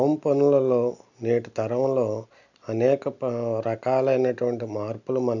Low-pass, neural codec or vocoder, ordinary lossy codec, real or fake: 7.2 kHz; none; none; real